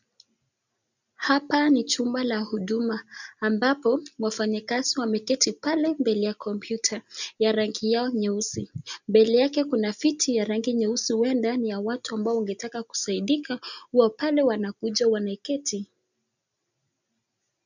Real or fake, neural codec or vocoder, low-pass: real; none; 7.2 kHz